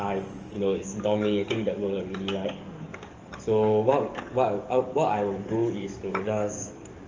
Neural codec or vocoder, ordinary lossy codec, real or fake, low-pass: codec, 44.1 kHz, 7.8 kbps, DAC; Opus, 24 kbps; fake; 7.2 kHz